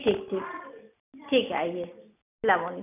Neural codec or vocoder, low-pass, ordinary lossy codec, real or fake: none; 3.6 kHz; none; real